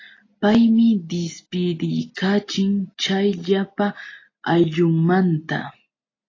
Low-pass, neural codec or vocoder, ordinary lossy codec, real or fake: 7.2 kHz; none; AAC, 32 kbps; real